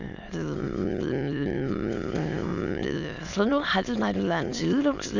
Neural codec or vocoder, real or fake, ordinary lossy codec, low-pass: autoencoder, 22.05 kHz, a latent of 192 numbers a frame, VITS, trained on many speakers; fake; none; 7.2 kHz